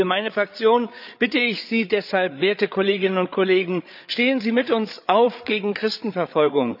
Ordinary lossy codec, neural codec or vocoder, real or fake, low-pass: none; codec, 16 kHz, 8 kbps, FreqCodec, larger model; fake; 5.4 kHz